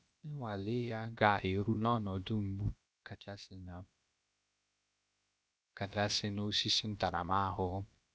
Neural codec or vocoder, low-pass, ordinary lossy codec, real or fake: codec, 16 kHz, about 1 kbps, DyCAST, with the encoder's durations; none; none; fake